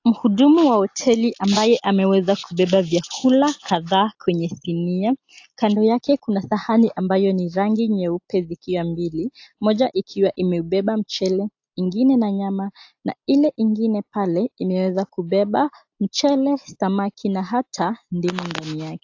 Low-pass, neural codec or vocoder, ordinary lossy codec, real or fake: 7.2 kHz; none; AAC, 48 kbps; real